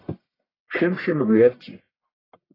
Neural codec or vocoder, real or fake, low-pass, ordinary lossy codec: codec, 44.1 kHz, 1.7 kbps, Pupu-Codec; fake; 5.4 kHz; AAC, 24 kbps